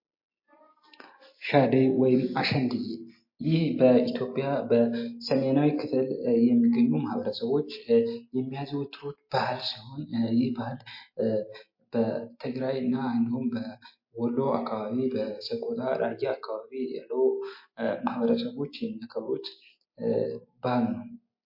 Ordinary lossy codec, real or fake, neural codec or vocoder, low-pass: MP3, 32 kbps; real; none; 5.4 kHz